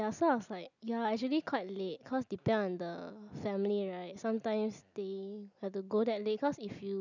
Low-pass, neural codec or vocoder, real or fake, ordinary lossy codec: 7.2 kHz; none; real; none